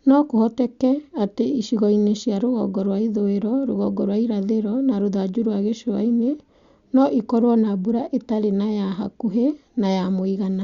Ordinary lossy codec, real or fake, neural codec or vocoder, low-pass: Opus, 64 kbps; real; none; 7.2 kHz